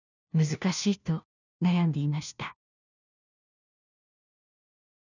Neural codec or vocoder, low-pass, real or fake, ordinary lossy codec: codec, 16 kHz, 1 kbps, FunCodec, trained on LibriTTS, 50 frames a second; 7.2 kHz; fake; none